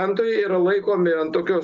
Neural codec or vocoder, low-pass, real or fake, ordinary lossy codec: none; 7.2 kHz; real; Opus, 24 kbps